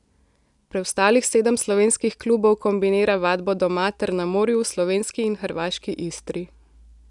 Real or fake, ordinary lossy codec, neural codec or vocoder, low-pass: real; none; none; 10.8 kHz